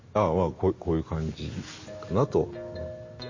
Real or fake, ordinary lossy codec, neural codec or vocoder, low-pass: real; MP3, 32 kbps; none; 7.2 kHz